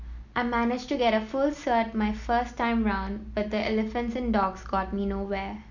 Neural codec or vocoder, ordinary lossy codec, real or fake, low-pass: none; none; real; 7.2 kHz